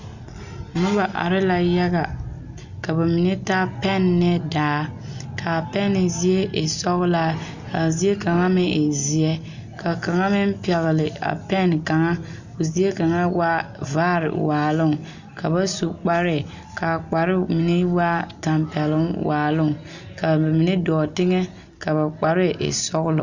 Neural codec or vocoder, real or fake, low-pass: none; real; 7.2 kHz